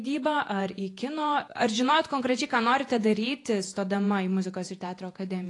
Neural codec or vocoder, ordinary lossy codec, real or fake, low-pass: vocoder, 48 kHz, 128 mel bands, Vocos; AAC, 48 kbps; fake; 10.8 kHz